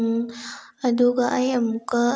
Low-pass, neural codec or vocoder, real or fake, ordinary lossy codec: none; none; real; none